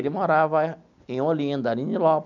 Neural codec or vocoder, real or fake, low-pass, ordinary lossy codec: none; real; 7.2 kHz; none